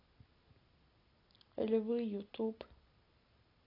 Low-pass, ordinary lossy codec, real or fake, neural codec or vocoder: 5.4 kHz; AAC, 24 kbps; real; none